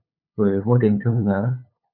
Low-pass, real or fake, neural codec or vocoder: 5.4 kHz; fake; codec, 16 kHz, 8 kbps, FunCodec, trained on LibriTTS, 25 frames a second